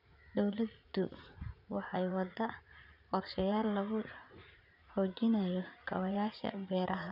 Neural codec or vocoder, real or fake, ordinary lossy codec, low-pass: vocoder, 44.1 kHz, 80 mel bands, Vocos; fake; none; 5.4 kHz